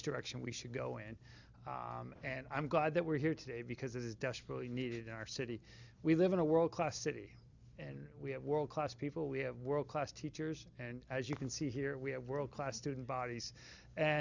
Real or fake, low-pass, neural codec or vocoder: real; 7.2 kHz; none